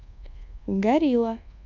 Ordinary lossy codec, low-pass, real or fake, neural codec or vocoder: none; 7.2 kHz; fake; codec, 24 kHz, 1.2 kbps, DualCodec